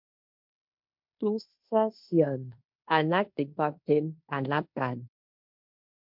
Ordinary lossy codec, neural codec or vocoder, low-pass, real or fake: MP3, 48 kbps; codec, 16 kHz in and 24 kHz out, 0.9 kbps, LongCat-Audio-Codec, fine tuned four codebook decoder; 5.4 kHz; fake